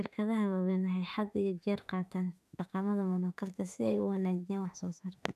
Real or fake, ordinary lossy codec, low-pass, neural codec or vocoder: fake; none; 14.4 kHz; autoencoder, 48 kHz, 32 numbers a frame, DAC-VAE, trained on Japanese speech